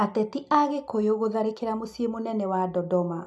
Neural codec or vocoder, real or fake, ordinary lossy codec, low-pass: none; real; none; none